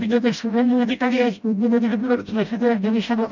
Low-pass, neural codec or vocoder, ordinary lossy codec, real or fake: 7.2 kHz; codec, 16 kHz, 0.5 kbps, FreqCodec, smaller model; none; fake